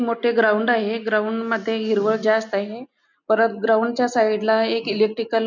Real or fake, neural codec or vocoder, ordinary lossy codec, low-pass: real; none; none; 7.2 kHz